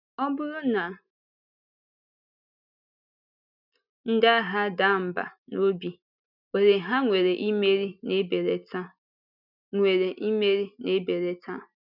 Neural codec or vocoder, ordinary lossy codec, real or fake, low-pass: none; none; real; 5.4 kHz